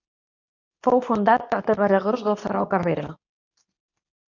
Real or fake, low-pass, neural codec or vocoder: fake; 7.2 kHz; codec, 24 kHz, 0.9 kbps, WavTokenizer, medium speech release version 2